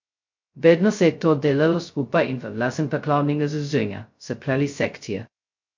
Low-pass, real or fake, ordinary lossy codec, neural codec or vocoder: 7.2 kHz; fake; MP3, 48 kbps; codec, 16 kHz, 0.2 kbps, FocalCodec